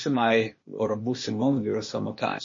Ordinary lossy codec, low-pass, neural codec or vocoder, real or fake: MP3, 32 kbps; 7.2 kHz; codec, 16 kHz, 0.8 kbps, ZipCodec; fake